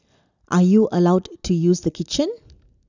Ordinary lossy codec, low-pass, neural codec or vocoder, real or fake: none; 7.2 kHz; none; real